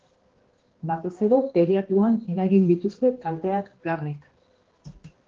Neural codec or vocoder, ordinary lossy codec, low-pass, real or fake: codec, 16 kHz, 1 kbps, X-Codec, HuBERT features, trained on balanced general audio; Opus, 16 kbps; 7.2 kHz; fake